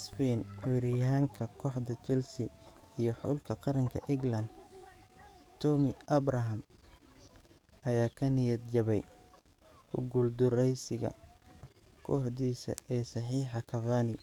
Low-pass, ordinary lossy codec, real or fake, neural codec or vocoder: 19.8 kHz; none; fake; codec, 44.1 kHz, 7.8 kbps, DAC